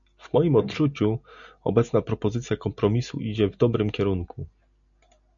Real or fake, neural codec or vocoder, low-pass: real; none; 7.2 kHz